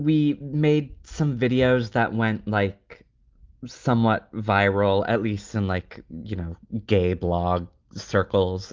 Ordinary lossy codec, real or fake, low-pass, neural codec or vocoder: Opus, 32 kbps; real; 7.2 kHz; none